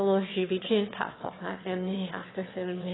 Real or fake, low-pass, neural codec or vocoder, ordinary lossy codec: fake; 7.2 kHz; autoencoder, 22.05 kHz, a latent of 192 numbers a frame, VITS, trained on one speaker; AAC, 16 kbps